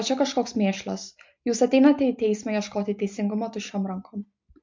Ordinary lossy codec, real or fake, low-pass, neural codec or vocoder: MP3, 48 kbps; real; 7.2 kHz; none